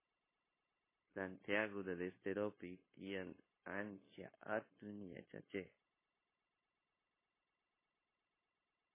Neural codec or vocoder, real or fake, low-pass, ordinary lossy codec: codec, 16 kHz, 0.9 kbps, LongCat-Audio-Codec; fake; 3.6 kHz; MP3, 16 kbps